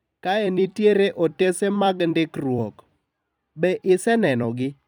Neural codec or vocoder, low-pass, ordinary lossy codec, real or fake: vocoder, 44.1 kHz, 128 mel bands every 256 samples, BigVGAN v2; 19.8 kHz; none; fake